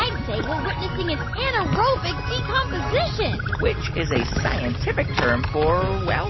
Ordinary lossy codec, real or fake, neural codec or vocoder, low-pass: MP3, 24 kbps; real; none; 7.2 kHz